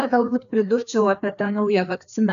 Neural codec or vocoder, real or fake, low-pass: codec, 16 kHz, 2 kbps, FreqCodec, larger model; fake; 7.2 kHz